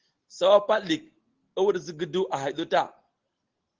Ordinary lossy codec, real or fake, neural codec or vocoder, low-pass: Opus, 16 kbps; real; none; 7.2 kHz